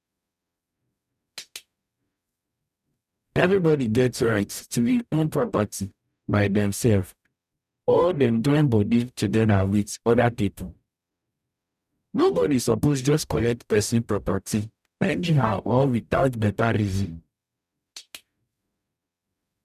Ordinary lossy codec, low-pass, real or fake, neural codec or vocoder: none; 14.4 kHz; fake; codec, 44.1 kHz, 0.9 kbps, DAC